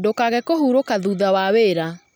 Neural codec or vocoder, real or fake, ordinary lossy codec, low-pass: none; real; none; none